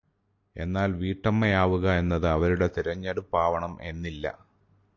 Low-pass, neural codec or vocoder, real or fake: 7.2 kHz; none; real